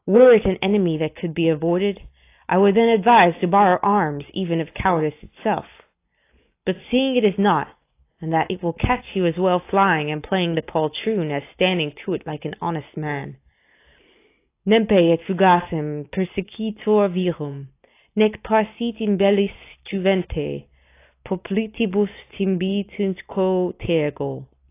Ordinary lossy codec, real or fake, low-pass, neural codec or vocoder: AAC, 24 kbps; real; 3.6 kHz; none